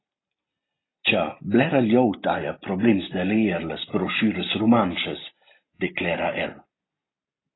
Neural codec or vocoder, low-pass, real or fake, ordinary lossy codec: none; 7.2 kHz; real; AAC, 16 kbps